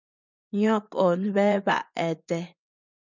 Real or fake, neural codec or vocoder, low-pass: fake; vocoder, 24 kHz, 100 mel bands, Vocos; 7.2 kHz